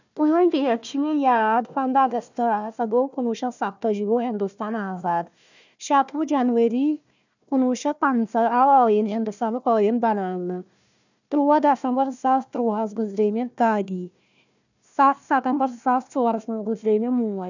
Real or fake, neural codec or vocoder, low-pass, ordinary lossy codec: fake; codec, 16 kHz, 1 kbps, FunCodec, trained on Chinese and English, 50 frames a second; 7.2 kHz; none